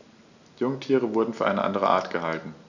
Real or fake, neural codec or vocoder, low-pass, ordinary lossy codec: real; none; 7.2 kHz; none